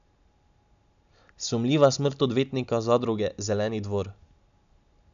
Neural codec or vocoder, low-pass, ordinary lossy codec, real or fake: none; 7.2 kHz; none; real